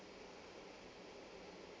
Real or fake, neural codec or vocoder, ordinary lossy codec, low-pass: real; none; none; none